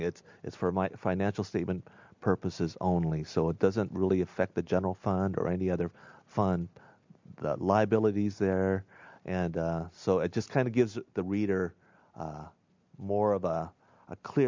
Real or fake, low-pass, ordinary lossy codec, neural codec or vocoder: real; 7.2 kHz; MP3, 64 kbps; none